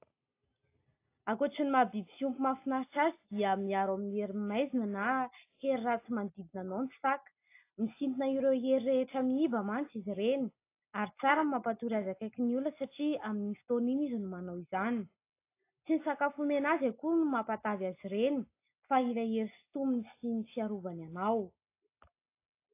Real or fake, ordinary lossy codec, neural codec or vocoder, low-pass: real; AAC, 24 kbps; none; 3.6 kHz